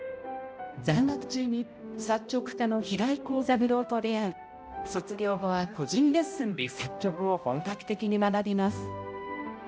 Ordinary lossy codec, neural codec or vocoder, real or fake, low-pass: none; codec, 16 kHz, 0.5 kbps, X-Codec, HuBERT features, trained on balanced general audio; fake; none